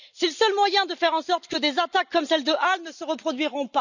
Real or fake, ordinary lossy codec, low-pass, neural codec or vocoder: real; none; 7.2 kHz; none